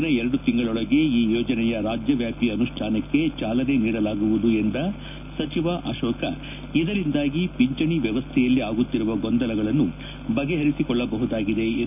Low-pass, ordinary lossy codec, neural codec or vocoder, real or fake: 3.6 kHz; none; none; real